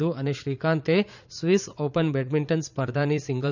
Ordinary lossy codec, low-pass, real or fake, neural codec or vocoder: none; 7.2 kHz; fake; vocoder, 44.1 kHz, 80 mel bands, Vocos